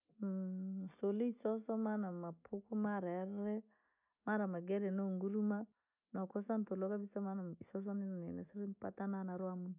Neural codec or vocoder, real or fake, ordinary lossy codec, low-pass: none; real; none; 3.6 kHz